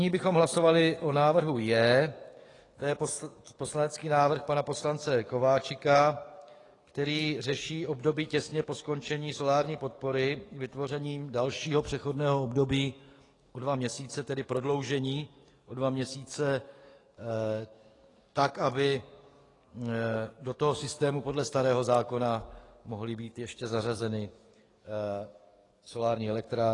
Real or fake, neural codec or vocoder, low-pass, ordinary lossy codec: fake; codec, 44.1 kHz, 7.8 kbps, DAC; 10.8 kHz; AAC, 32 kbps